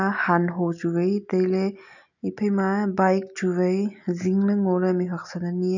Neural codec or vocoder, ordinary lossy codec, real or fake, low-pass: none; none; real; 7.2 kHz